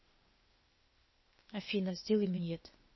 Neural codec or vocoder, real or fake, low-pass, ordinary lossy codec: codec, 16 kHz, 0.8 kbps, ZipCodec; fake; 7.2 kHz; MP3, 24 kbps